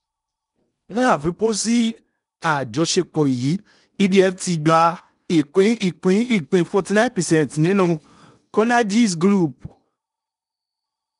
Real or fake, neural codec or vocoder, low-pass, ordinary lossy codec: fake; codec, 16 kHz in and 24 kHz out, 0.8 kbps, FocalCodec, streaming, 65536 codes; 10.8 kHz; none